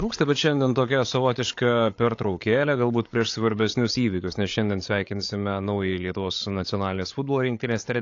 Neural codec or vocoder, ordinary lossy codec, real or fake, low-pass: codec, 16 kHz, 8 kbps, FreqCodec, larger model; AAC, 48 kbps; fake; 7.2 kHz